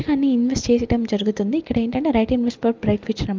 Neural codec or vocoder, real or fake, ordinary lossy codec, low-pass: none; real; Opus, 24 kbps; 7.2 kHz